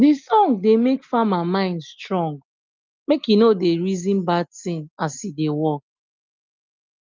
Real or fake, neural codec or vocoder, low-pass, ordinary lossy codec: real; none; 7.2 kHz; Opus, 24 kbps